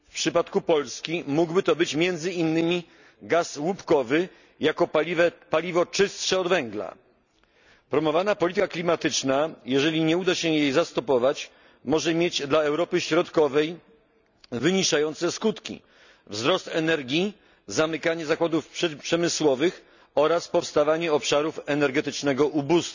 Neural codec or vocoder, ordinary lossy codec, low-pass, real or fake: none; none; 7.2 kHz; real